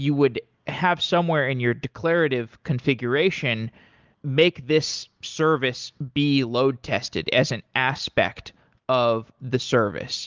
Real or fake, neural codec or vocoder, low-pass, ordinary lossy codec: real; none; 7.2 kHz; Opus, 32 kbps